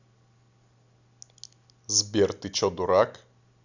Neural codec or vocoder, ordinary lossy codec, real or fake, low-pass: none; none; real; 7.2 kHz